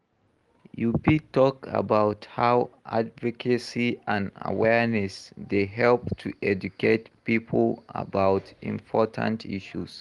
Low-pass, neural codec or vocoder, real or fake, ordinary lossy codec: 10.8 kHz; none; real; Opus, 24 kbps